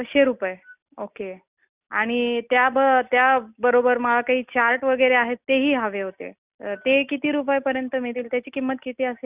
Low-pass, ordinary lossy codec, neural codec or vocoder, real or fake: 3.6 kHz; Opus, 64 kbps; none; real